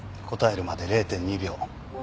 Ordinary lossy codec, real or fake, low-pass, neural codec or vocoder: none; real; none; none